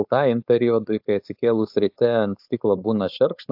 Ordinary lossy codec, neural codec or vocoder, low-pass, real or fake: AAC, 48 kbps; codec, 16 kHz, 4.8 kbps, FACodec; 5.4 kHz; fake